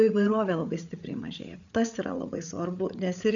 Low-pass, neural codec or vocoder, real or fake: 7.2 kHz; codec, 16 kHz, 8 kbps, FreqCodec, larger model; fake